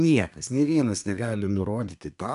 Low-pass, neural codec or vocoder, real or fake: 10.8 kHz; codec, 24 kHz, 1 kbps, SNAC; fake